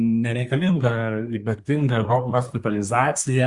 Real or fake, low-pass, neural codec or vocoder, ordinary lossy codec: fake; 10.8 kHz; codec, 24 kHz, 1 kbps, SNAC; Opus, 64 kbps